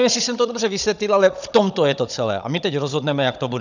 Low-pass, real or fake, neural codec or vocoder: 7.2 kHz; fake; codec, 16 kHz, 16 kbps, FunCodec, trained on Chinese and English, 50 frames a second